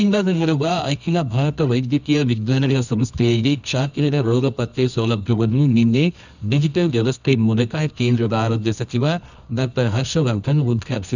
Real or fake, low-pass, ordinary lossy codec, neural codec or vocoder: fake; 7.2 kHz; none; codec, 24 kHz, 0.9 kbps, WavTokenizer, medium music audio release